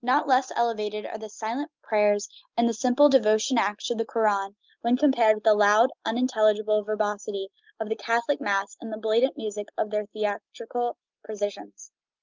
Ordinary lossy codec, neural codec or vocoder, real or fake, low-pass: Opus, 24 kbps; none; real; 7.2 kHz